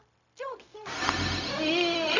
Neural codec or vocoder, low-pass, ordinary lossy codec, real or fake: codec, 16 kHz, 0.4 kbps, LongCat-Audio-Codec; 7.2 kHz; none; fake